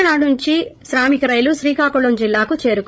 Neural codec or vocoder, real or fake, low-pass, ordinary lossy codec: codec, 16 kHz, 16 kbps, FreqCodec, larger model; fake; none; none